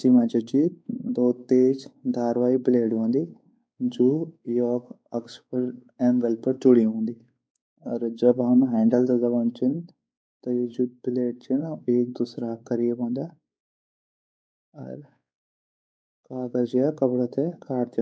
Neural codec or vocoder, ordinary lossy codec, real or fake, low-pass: codec, 16 kHz, 4 kbps, X-Codec, WavLM features, trained on Multilingual LibriSpeech; none; fake; none